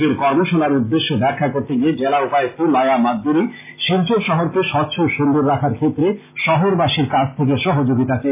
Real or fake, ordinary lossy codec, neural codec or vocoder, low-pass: real; MP3, 24 kbps; none; 3.6 kHz